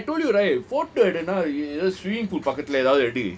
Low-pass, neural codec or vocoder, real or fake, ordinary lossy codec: none; none; real; none